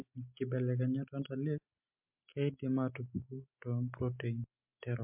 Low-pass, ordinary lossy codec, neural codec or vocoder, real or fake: 3.6 kHz; MP3, 32 kbps; none; real